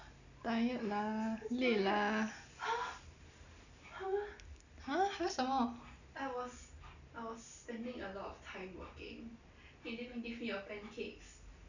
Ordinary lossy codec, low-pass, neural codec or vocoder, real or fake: none; 7.2 kHz; none; real